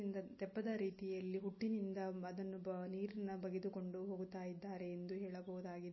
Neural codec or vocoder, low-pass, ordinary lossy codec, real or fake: none; 7.2 kHz; MP3, 24 kbps; real